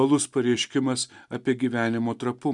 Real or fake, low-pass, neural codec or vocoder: real; 10.8 kHz; none